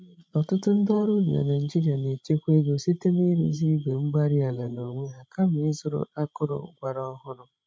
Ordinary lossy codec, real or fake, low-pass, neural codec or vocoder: none; fake; none; codec, 16 kHz, 16 kbps, FreqCodec, larger model